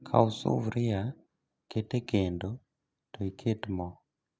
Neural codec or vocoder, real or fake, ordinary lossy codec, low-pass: none; real; none; none